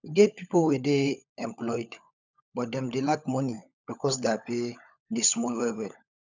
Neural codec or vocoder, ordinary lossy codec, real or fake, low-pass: codec, 16 kHz, 16 kbps, FunCodec, trained on LibriTTS, 50 frames a second; none; fake; 7.2 kHz